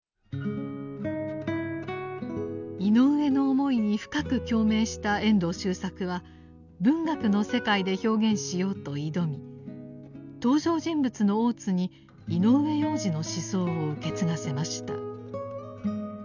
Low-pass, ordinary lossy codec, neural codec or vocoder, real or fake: 7.2 kHz; none; none; real